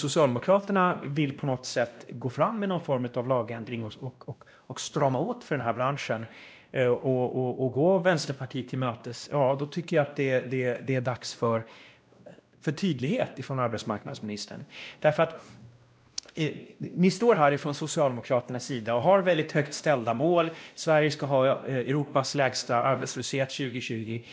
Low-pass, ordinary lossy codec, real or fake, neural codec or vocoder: none; none; fake; codec, 16 kHz, 1 kbps, X-Codec, WavLM features, trained on Multilingual LibriSpeech